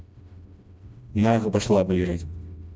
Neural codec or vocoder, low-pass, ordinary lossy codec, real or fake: codec, 16 kHz, 1 kbps, FreqCodec, smaller model; none; none; fake